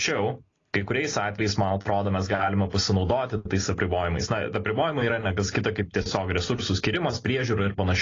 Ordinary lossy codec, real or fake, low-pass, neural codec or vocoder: AAC, 32 kbps; real; 7.2 kHz; none